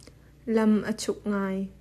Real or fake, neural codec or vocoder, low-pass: real; none; 14.4 kHz